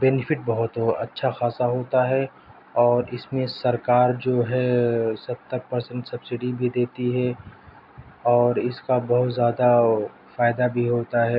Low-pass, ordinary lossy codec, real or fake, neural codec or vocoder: 5.4 kHz; none; real; none